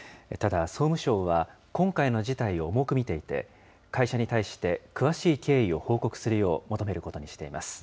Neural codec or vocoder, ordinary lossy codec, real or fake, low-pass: none; none; real; none